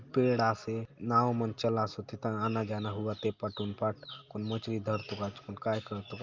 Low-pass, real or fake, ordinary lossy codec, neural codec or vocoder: 7.2 kHz; real; Opus, 24 kbps; none